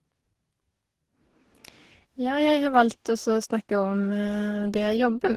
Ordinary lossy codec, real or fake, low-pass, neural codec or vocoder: Opus, 16 kbps; fake; 14.4 kHz; codec, 44.1 kHz, 2.6 kbps, DAC